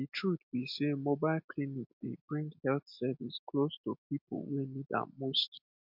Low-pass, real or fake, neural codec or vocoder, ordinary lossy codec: 5.4 kHz; real; none; MP3, 48 kbps